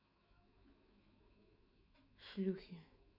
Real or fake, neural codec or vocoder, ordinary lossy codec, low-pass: fake; autoencoder, 48 kHz, 128 numbers a frame, DAC-VAE, trained on Japanese speech; none; 5.4 kHz